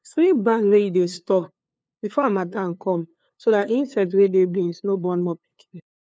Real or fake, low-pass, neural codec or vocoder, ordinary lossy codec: fake; none; codec, 16 kHz, 2 kbps, FunCodec, trained on LibriTTS, 25 frames a second; none